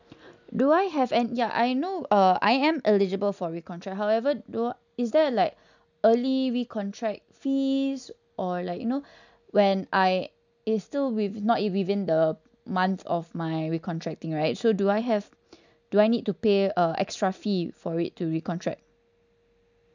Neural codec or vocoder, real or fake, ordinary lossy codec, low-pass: none; real; none; 7.2 kHz